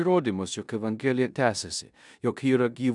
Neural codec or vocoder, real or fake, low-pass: codec, 16 kHz in and 24 kHz out, 0.9 kbps, LongCat-Audio-Codec, four codebook decoder; fake; 10.8 kHz